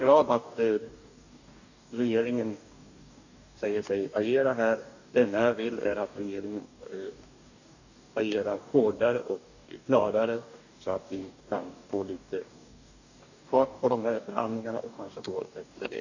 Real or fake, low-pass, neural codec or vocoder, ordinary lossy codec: fake; 7.2 kHz; codec, 44.1 kHz, 2.6 kbps, DAC; none